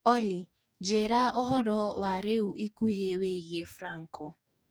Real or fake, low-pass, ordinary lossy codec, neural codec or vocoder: fake; none; none; codec, 44.1 kHz, 2.6 kbps, DAC